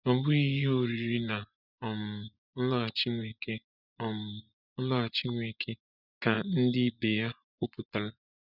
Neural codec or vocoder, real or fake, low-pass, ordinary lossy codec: vocoder, 24 kHz, 100 mel bands, Vocos; fake; 5.4 kHz; none